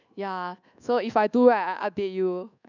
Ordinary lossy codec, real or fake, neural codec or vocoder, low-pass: none; fake; codec, 24 kHz, 1.2 kbps, DualCodec; 7.2 kHz